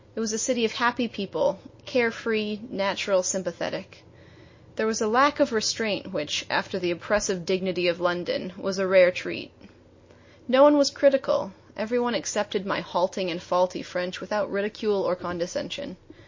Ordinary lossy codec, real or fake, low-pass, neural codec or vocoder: MP3, 32 kbps; real; 7.2 kHz; none